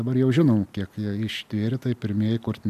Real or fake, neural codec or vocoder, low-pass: real; none; 14.4 kHz